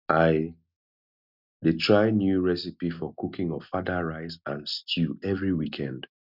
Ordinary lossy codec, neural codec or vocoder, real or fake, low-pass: none; none; real; 5.4 kHz